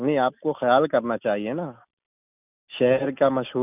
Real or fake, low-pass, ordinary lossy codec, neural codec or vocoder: real; 3.6 kHz; none; none